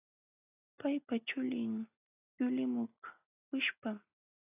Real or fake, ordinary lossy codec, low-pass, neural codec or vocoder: real; AAC, 32 kbps; 3.6 kHz; none